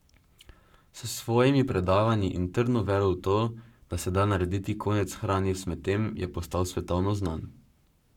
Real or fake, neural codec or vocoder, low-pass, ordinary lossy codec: fake; codec, 44.1 kHz, 7.8 kbps, Pupu-Codec; 19.8 kHz; none